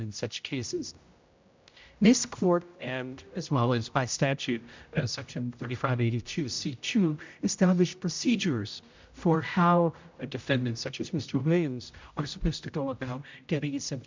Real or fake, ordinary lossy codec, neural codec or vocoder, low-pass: fake; MP3, 64 kbps; codec, 16 kHz, 0.5 kbps, X-Codec, HuBERT features, trained on general audio; 7.2 kHz